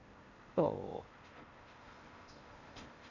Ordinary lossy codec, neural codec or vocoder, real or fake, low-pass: Opus, 64 kbps; codec, 16 kHz in and 24 kHz out, 0.8 kbps, FocalCodec, streaming, 65536 codes; fake; 7.2 kHz